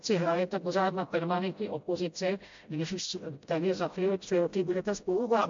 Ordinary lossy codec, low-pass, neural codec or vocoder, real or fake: MP3, 48 kbps; 7.2 kHz; codec, 16 kHz, 0.5 kbps, FreqCodec, smaller model; fake